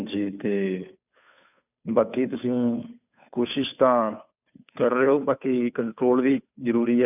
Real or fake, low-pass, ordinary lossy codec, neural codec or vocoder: fake; 3.6 kHz; none; codec, 16 kHz, 2 kbps, FunCodec, trained on Chinese and English, 25 frames a second